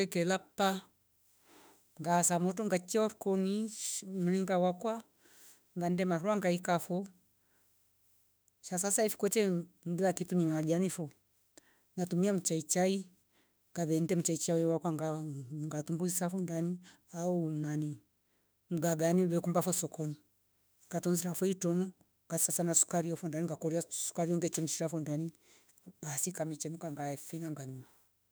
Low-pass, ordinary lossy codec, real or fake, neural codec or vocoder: none; none; fake; autoencoder, 48 kHz, 32 numbers a frame, DAC-VAE, trained on Japanese speech